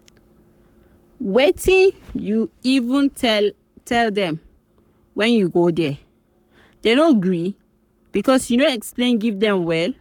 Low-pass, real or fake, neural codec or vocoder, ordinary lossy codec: 19.8 kHz; fake; codec, 44.1 kHz, 7.8 kbps, Pupu-Codec; none